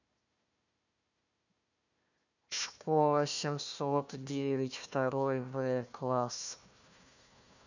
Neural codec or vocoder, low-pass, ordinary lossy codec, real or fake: codec, 16 kHz, 1 kbps, FunCodec, trained on Chinese and English, 50 frames a second; 7.2 kHz; none; fake